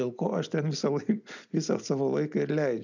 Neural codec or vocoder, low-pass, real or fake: none; 7.2 kHz; real